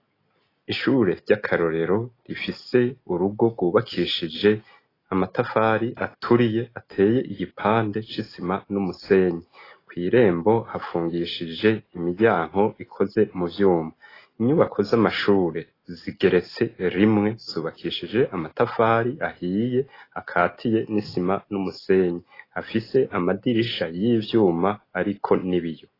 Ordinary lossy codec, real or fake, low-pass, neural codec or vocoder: AAC, 24 kbps; real; 5.4 kHz; none